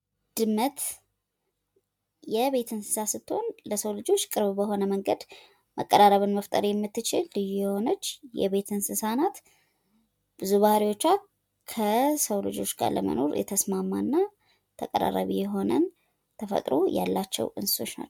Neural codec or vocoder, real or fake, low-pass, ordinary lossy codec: none; real; 19.8 kHz; MP3, 96 kbps